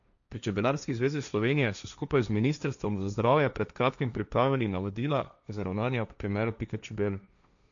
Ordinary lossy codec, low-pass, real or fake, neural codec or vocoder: none; 7.2 kHz; fake; codec, 16 kHz, 1.1 kbps, Voila-Tokenizer